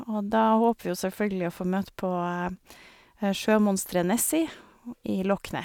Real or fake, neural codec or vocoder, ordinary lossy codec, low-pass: real; none; none; none